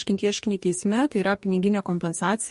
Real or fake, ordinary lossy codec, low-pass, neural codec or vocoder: fake; MP3, 48 kbps; 14.4 kHz; codec, 44.1 kHz, 2.6 kbps, DAC